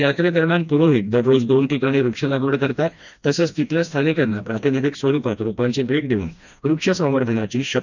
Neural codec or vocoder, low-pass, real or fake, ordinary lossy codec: codec, 16 kHz, 1 kbps, FreqCodec, smaller model; 7.2 kHz; fake; none